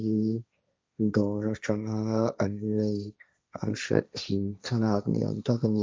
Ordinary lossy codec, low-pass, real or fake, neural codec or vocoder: none; none; fake; codec, 16 kHz, 1.1 kbps, Voila-Tokenizer